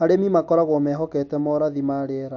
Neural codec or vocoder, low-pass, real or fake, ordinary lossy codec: none; 7.2 kHz; real; AAC, 48 kbps